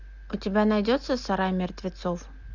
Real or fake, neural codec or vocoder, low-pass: real; none; 7.2 kHz